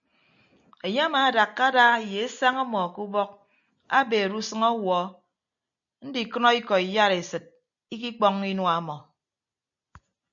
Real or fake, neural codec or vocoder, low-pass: real; none; 7.2 kHz